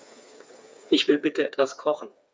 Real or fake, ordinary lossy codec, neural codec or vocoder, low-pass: fake; none; codec, 16 kHz, 4 kbps, FreqCodec, smaller model; none